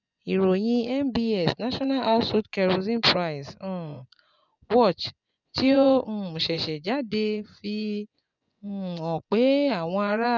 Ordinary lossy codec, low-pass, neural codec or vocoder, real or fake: none; 7.2 kHz; vocoder, 24 kHz, 100 mel bands, Vocos; fake